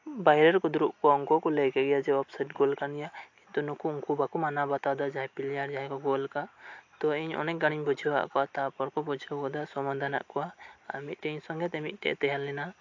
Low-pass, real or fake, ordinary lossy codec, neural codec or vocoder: 7.2 kHz; real; AAC, 48 kbps; none